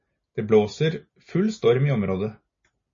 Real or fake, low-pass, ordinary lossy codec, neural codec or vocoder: real; 7.2 kHz; MP3, 32 kbps; none